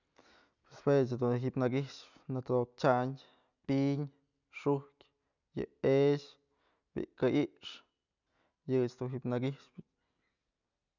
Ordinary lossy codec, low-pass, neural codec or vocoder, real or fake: none; 7.2 kHz; none; real